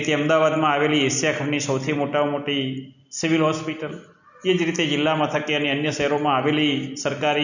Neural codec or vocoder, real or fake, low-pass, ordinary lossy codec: none; real; 7.2 kHz; none